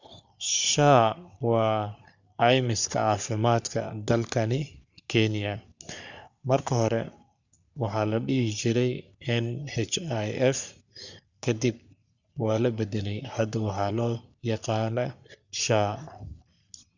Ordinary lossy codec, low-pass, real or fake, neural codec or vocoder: none; 7.2 kHz; fake; codec, 44.1 kHz, 3.4 kbps, Pupu-Codec